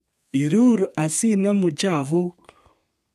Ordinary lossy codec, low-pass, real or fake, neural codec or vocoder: none; 14.4 kHz; fake; codec, 32 kHz, 1.9 kbps, SNAC